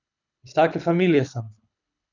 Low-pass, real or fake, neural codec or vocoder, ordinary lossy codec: 7.2 kHz; fake; codec, 24 kHz, 6 kbps, HILCodec; none